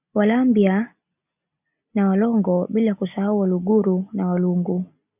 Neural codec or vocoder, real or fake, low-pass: none; real; 3.6 kHz